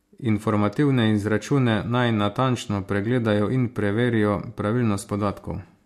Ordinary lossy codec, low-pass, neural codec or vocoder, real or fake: MP3, 64 kbps; 14.4 kHz; none; real